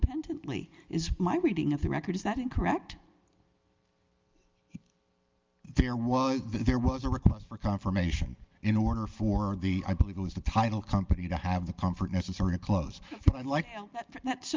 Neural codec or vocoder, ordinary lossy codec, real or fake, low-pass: none; Opus, 32 kbps; real; 7.2 kHz